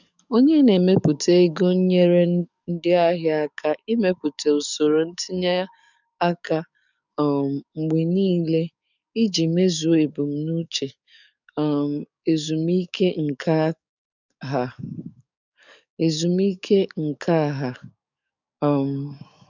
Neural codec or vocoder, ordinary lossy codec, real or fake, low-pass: codec, 44.1 kHz, 7.8 kbps, DAC; none; fake; 7.2 kHz